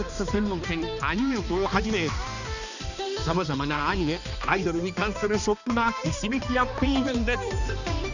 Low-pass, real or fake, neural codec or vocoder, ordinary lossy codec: 7.2 kHz; fake; codec, 16 kHz, 2 kbps, X-Codec, HuBERT features, trained on balanced general audio; none